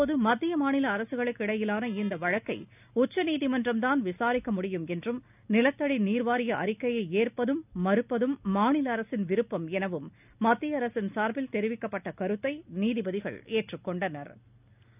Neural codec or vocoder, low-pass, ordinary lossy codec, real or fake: none; 3.6 kHz; none; real